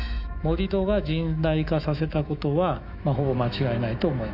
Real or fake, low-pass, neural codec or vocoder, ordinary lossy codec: fake; 5.4 kHz; autoencoder, 48 kHz, 128 numbers a frame, DAC-VAE, trained on Japanese speech; AAC, 48 kbps